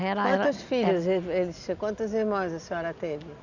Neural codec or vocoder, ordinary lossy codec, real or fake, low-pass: none; none; real; 7.2 kHz